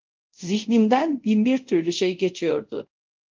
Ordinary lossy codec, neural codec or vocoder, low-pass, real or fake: Opus, 32 kbps; codec, 24 kHz, 0.5 kbps, DualCodec; 7.2 kHz; fake